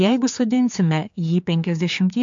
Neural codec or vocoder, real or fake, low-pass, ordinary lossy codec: codec, 16 kHz, 4 kbps, X-Codec, HuBERT features, trained on general audio; fake; 7.2 kHz; MP3, 48 kbps